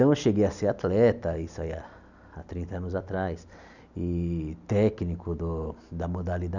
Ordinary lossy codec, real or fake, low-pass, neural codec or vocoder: none; real; 7.2 kHz; none